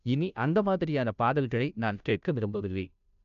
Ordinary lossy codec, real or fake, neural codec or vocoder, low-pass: none; fake; codec, 16 kHz, 0.5 kbps, FunCodec, trained on Chinese and English, 25 frames a second; 7.2 kHz